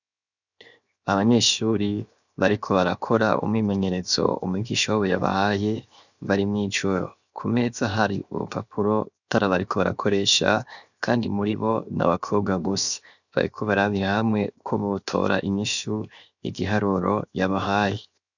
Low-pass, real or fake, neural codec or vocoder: 7.2 kHz; fake; codec, 16 kHz, 0.7 kbps, FocalCodec